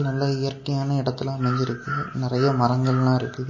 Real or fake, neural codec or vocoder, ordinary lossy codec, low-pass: real; none; MP3, 32 kbps; 7.2 kHz